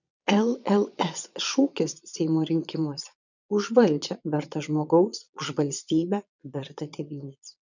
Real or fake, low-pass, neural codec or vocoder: fake; 7.2 kHz; vocoder, 22.05 kHz, 80 mel bands, Vocos